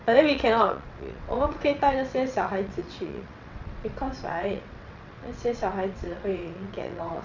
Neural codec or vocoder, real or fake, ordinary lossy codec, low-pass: vocoder, 22.05 kHz, 80 mel bands, WaveNeXt; fake; none; 7.2 kHz